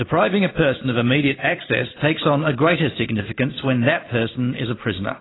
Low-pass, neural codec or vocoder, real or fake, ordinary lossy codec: 7.2 kHz; none; real; AAC, 16 kbps